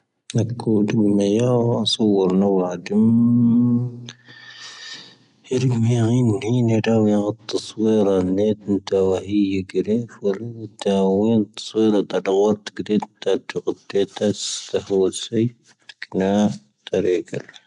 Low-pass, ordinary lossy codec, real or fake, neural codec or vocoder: 10.8 kHz; none; real; none